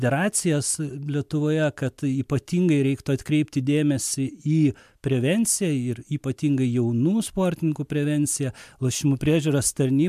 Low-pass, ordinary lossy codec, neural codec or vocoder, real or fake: 14.4 kHz; MP3, 96 kbps; none; real